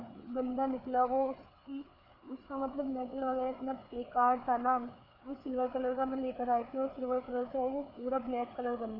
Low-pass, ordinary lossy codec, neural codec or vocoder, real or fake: 5.4 kHz; none; codec, 16 kHz, 4 kbps, FreqCodec, larger model; fake